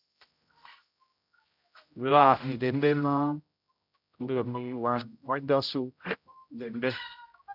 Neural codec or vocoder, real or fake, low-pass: codec, 16 kHz, 0.5 kbps, X-Codec, HuBERT features, trained on general audio; fake; 5.4 kHz